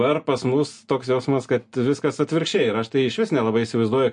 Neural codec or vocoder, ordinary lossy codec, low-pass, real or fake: none; MP3, 48 kbps; 9.9 kHz; real